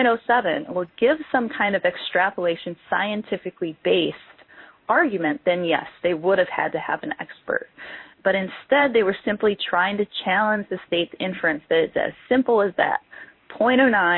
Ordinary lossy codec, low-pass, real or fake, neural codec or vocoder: MP3, 24 kbps; 5.4 kHz; fake; codec, 16 kHz in and 24 kHz out, 1 kbps, XY-Tokenizer